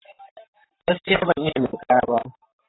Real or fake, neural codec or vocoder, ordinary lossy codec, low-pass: fake; vocoder, 44.1 kHz, 128 mel bands, Pupu-Vocoder; AAC, 16 kbps; 7.2 kHz